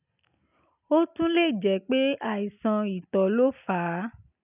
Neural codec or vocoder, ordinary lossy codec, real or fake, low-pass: none; none; real; 3.6 kHz